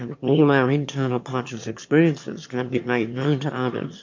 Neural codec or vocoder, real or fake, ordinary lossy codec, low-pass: autoencoder, 22.05 kHz, a latent of 192 numbers a frame, VITS, trained on one speaker; fake; MP3, 48 kbps; 7.2 kHz